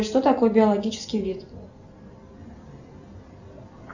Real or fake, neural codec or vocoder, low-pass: real; none; 7.2 kHz